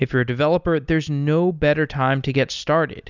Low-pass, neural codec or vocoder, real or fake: 7.2 kHz; none; real